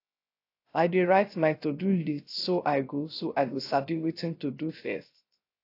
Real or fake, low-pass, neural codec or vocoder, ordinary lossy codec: fake; 5.4 kHz; codec, 16 kHz, 0.3 kbps, FocalCodec; AAC, 32 kbps